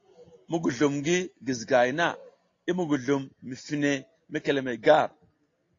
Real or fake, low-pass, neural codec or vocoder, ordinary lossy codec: real; 7.2 kHz; none; AAC, 32 kbps